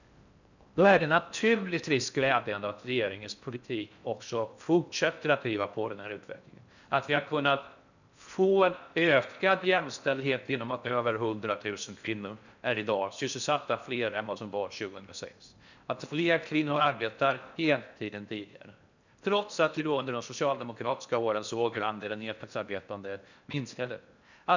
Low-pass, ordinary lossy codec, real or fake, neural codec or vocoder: 7.2 kHz; none; fake; codec, 16 kHz in and 24 kHz out, 0.6 kbps, FocalCodec, streaming, 4096 codes